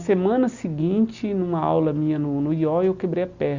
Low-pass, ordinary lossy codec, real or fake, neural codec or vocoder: 7.2 kHz; Opus, 64 kbps; real; none